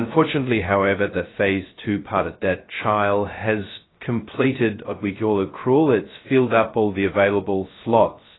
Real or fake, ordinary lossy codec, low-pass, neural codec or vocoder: fake; AAC, 16 kbps; 7.2 kHz; codec, 16 kHz, 0.2 kbps, FocalCodec